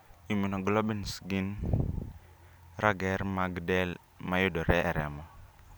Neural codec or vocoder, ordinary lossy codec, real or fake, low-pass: none; none; real; none